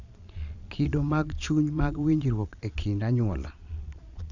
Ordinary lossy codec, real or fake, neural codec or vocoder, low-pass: none; fake; vocoder, 22.05 kHz, 80 mel bands, Vocos; 7.2 kHz